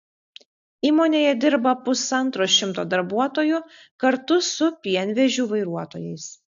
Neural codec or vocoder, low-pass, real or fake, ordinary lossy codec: none; 7.2 kHz; real; AAC, 64 kbps